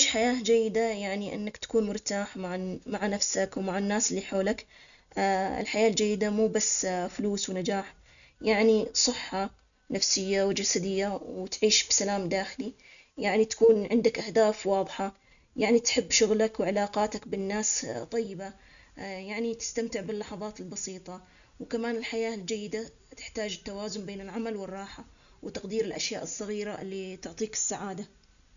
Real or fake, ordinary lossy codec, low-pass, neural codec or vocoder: real; none; 7.2 kHz; none